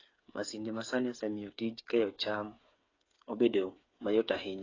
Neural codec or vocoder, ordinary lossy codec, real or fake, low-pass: codec, 16 kHz, 8 kbps, FreqCodec, smaller model; AAC, 32 kbps; fake; 7.2 kHz